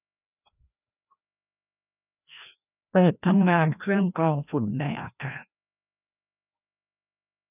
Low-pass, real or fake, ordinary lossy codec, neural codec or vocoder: 3.6 kHz; fake; none; codec, 16 kHz, 1 kbps, FreqCodec, larger model